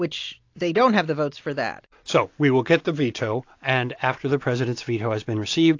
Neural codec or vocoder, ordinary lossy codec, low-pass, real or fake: none; AAC, 48 kbps; 7.2 kHz; real